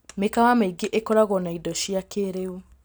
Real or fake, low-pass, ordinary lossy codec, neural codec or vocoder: real; none; none; none